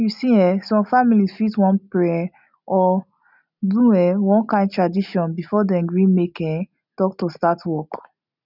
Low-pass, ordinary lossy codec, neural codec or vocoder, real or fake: 5.4 kHz; none; none; real